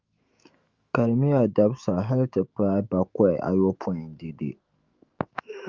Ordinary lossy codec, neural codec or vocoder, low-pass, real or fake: Opus, 32 kbps; autoencoder, 48 kHz, 128 numbers a frame, DAC-VAE, trained on Japanese speech; 7.2 kHz; fake